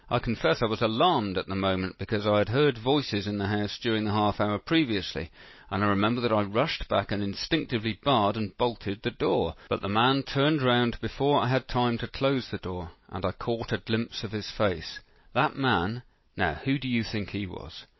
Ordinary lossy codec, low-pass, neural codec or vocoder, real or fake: MP3, 24 kbps; 7.2 kHz; none; real